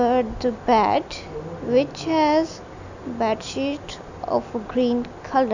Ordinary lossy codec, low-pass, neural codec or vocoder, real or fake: none; 7.2 kHz; none; real